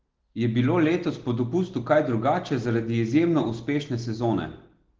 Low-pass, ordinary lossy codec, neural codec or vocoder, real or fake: 7.2 kHz; Opus, 16 kbps; none; real